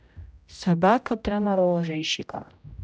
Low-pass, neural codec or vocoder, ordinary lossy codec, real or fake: none; codec, 16 kHz, 0.5 kbps, X-Codec, HuBERT features, trained on general audio; none; fake